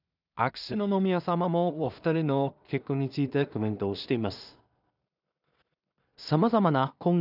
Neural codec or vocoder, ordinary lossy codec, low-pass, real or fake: codec, 16 kHz in and 24 kHz out, 0.4 kbps, LongCat-Audio-Codec, two codebook decoder; none; 5.4 kHz; fake